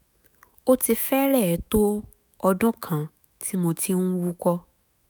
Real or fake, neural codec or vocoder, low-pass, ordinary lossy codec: fake; autoencoder, 48 kHz, 128 numbers a frame, DAC-VAE, trained on Japanese speech; none; none